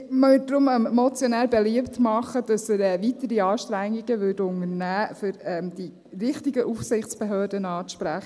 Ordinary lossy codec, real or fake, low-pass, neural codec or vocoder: none; real; none; none